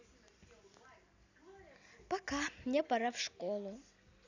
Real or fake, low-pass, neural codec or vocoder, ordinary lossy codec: real; 7.2 kHz; none; none